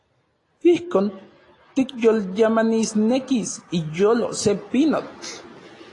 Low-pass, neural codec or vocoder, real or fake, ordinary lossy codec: 10.8 kHz; none; real; AAC, 32 kbps